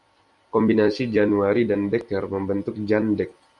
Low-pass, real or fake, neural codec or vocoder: 10.8 kHz; fake; vocoder, 44.1 kHz, 128 mel bands every 256 samples, BigVGAN v2